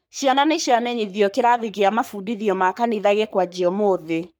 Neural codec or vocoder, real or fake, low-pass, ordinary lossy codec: codec, 44.1 kHz, 3.4 kbps, Pupu-Codec; fake; none; none